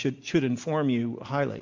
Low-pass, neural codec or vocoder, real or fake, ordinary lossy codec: 7.2 kHz; none; real; MP3, 48 kbps